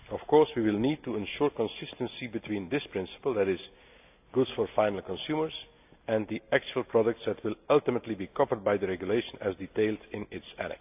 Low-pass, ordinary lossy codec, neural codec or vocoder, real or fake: 3.6 kHz; AAC, 32 kbps; none; real